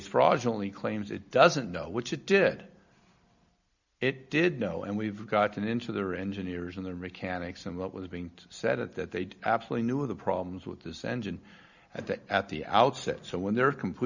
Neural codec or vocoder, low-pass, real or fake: none; 7.2 kHz; real